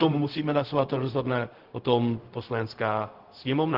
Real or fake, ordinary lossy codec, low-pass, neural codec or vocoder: fake; Opus, 16 kbps; 5.4 kHz; codec, 16 kHz, 0.4 kbps, LongCat-Audio-Codec